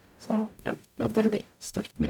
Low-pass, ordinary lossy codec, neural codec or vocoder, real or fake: 19.8 kHz; none; codec, 44.1 kHz, 0.9 kbps, DAC; fake